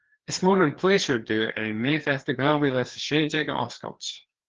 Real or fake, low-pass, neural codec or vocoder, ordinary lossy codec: fake; 7.2 kHz; codec, 16 kHz, 2 kbps, FreqCodec, larger model; Opus, 16 kbps